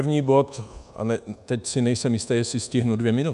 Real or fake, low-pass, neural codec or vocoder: fake; 10.8 kHz; codec, 24 kHz, 1.2 kbps, DualCodec